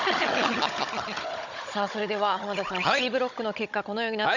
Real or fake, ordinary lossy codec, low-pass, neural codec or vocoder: fake; none; 7.2 kHz; codec, 16 kHz, 16 kbps, FunCodec, trained on Chinese and English, 50 frames a second